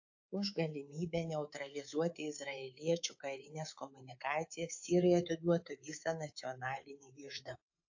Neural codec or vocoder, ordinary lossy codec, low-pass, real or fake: codec, 24 kHz, 3.1 kbps, DualCodec; AAC, 48 kbps; 7.2 kHz; fake